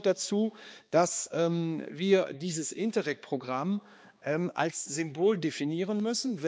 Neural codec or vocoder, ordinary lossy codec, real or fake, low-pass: codec, 16 kHz, 2 kbps, X-Codec, HuBERT features, trained on balanced general audio; none; fake; none